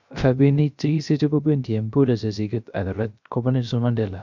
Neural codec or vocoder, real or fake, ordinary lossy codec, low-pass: codec, 16 kHz, 0.3 kbps, FocalCodec; fake; none; 7.2 kHz